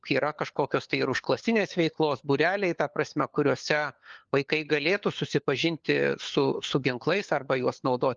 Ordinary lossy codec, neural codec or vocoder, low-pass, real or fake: Opus, 32 kbps; codec, 16 kHz, 4 kbps, FunCodec, trained on LibriTTS, 50 frames a second; 7.2 kHz; fake